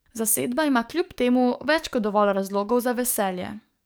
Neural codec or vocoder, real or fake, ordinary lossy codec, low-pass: codec, 44.1 kHz, 7.8 kbps, DAC; fake; none; none